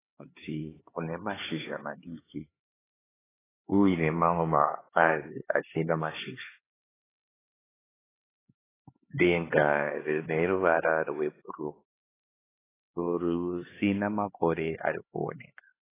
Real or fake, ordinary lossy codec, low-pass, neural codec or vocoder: fake; AAC, 16 kbps; 3.6 kHz; codec, 16 kHz, 4 kbps, X-Codec, HuBERT features, trained on LibriSpeech